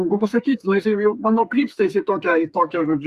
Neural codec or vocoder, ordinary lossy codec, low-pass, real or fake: codec, 32 kHz, 1.9 kbps, SNAC; Opus, 64 kbps; 14.4 kHz; fake